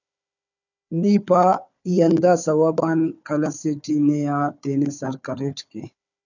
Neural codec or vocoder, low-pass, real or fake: codec, 16 kHz, 4 kbps, FunCodec, trained on Chinese and English, 50 frames a second; 7.2 kHz; fake